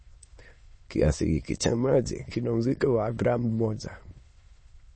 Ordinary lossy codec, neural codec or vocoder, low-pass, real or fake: MP3, 32 kbps; autoencoder, 22.05 kHz, a latent of 192 numbers a frame, VITS, trained on many speakers; 9.9 kHz; fake